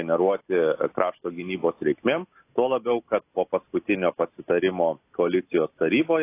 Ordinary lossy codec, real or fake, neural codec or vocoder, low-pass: AAC, 24 kbps; real; none; 3.6 kHz